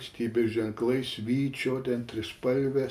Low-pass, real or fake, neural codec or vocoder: 14.4 kHz; real; none